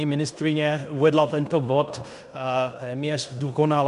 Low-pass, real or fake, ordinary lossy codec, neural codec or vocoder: 10.8 kHz; fake; MP3, 96 kbps; codec, 16 kHz in and 24 kHz out, 0.9 kbps, LongCat-Audio-Codec, fine tuned four codebook decoder